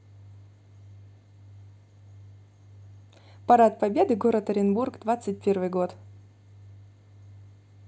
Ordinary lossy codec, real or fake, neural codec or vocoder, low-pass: none; real; none; none